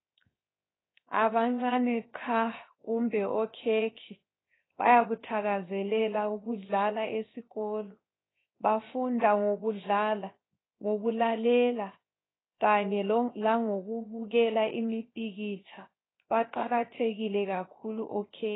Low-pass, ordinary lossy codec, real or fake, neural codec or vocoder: 7.2 kHz; AAC, 16 kbps; fake; codec, 16 kHz, 0.7 kbps, FocalCodec